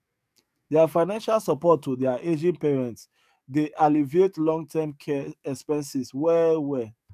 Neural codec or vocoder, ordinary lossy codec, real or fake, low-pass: codec, 44.1 kHz, 7.8 kbps, DAC; none; fake; 14.4 kHz